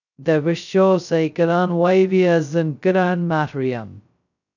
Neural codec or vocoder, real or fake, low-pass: codec, 16 kHz, 0.2 kbps, FocalCodec; fake; 7.2 kHz